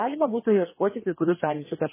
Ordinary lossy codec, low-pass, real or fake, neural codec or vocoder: MP3, 16 kbps; 3.6 kHz; fake; codec, 16 kHz, 2 kbps, FreqCodec, larger model